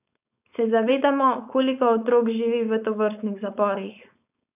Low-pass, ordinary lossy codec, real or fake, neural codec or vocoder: 3.6 kHz; none; fake; codec, 16 kHz, 4.8 kbps, FACodec